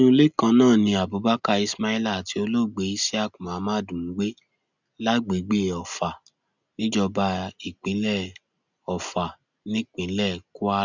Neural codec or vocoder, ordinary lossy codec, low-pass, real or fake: none; none; 7.2 kHz; real